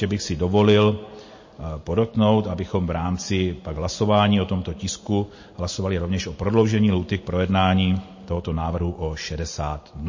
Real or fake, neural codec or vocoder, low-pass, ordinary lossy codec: real; none; 7.2 kHz; MP3, 32 kbps